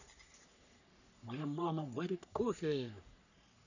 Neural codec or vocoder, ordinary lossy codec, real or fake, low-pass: codec, 44.1 kHz, 3.4 kbps, Pupu-Codec; none; fake; 7.2 kHz